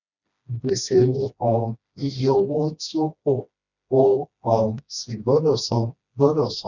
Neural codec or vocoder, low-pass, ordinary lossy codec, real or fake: codec, 16 kHz, 1 kbps, FreqCodec, smaller model; 7.2 kHz; none; fake